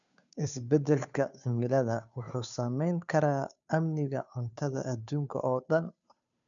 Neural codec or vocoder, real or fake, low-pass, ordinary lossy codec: codec, 16 kHz, 2 kbps, FunCodec, trained on Chinese and English, 25 frames a second; fake; 7.2 kHz; none